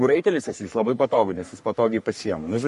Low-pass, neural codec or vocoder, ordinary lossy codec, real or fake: 14.4 kHz; codec, 44.1 kHz, 3.4 kbps, Pupu-Codec; MP3, 48 kbps; fake